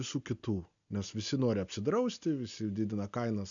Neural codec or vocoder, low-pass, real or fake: none; 7.2 kHz; real